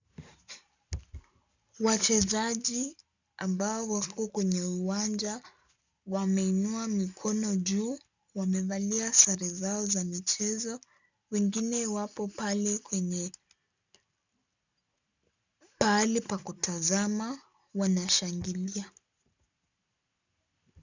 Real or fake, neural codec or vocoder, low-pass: real; none; 7.2 kHz